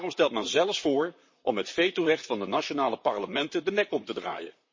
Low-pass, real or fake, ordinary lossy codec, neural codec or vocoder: 7.2 kHz; fake; MP3, 32 kbps; vocoder, 44.1 kHz, 128 mel bands, Pupu-Vocoder